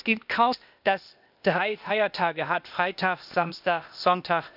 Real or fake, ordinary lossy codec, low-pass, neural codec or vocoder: fake; none; 5.4 kHz; codec, 16 kHz, 0.8 kbps, ZipCodec